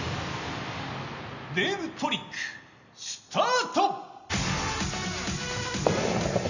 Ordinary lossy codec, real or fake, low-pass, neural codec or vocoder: none; real; 7.2 kHz; none